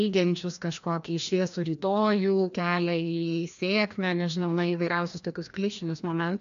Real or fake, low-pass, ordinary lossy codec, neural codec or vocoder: fake; 7.2 kHz; AAC, 96 kbps; codec, 16 kHz, 1 kbps, FreqCodec, larger model